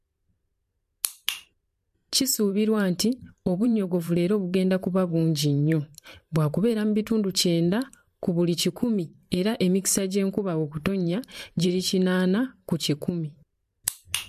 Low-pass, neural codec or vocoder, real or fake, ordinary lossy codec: 14.4 kHz; vocoder, 44.1 kHz, 128 mel bands every 512 samples, BigVGAN v2; fake; MP3, 64 kbps